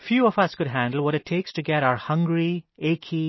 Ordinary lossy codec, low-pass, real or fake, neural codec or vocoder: MP3, 24 kbps; 7.2 kHz; real; none